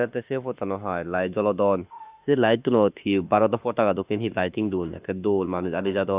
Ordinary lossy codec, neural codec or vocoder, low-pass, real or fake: Opus, 64 kbps; autoencoder, 48 kHz, 32 numbers a frame, DAC-VAE, trained on Japanese speech; 3.6 kHz; fake